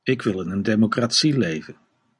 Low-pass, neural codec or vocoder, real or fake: 10.8 kHz; none; real